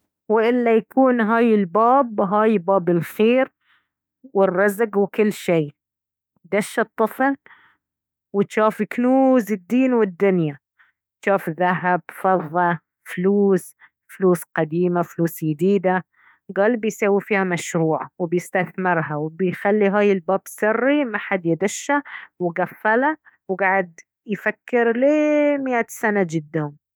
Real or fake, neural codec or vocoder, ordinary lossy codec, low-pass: fake; autoencoder, 48 kHz, 32 numbers a frame, DAC-VAE, trained on Japanese speech; none; none